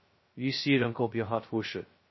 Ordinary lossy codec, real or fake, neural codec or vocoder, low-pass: MP3, 24 kbps; fake; codec, 16 kHz, 0.2 kbps, FocalCodec; 7.2 kHz